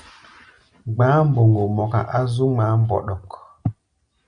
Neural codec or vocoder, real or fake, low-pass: none; real; 9.9 kHz